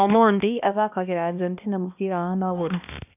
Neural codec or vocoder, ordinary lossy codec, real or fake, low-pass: codec, 16 kHz, 1 kbps, X-Codec, HuBERT features, trained on balanced general audio; none; fake; 3.6 kHz